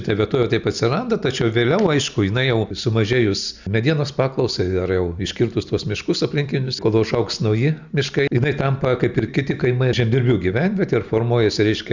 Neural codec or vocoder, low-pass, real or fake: none; 7.2 kHz; real